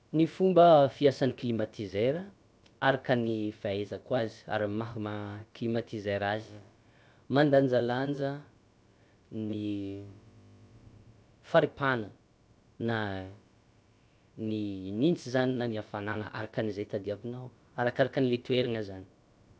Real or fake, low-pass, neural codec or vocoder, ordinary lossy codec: fake; none; codec, 16 kHz, about 1 kbps, DyCAST, with the encoder's durations; none